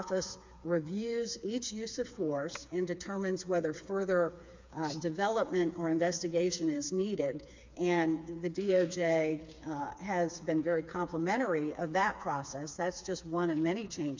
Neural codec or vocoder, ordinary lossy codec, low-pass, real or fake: codec, 16 kHz, 4 kbps, FreqCodec, smaller model; MP3, 64 kbps; 7.2 kHz; fake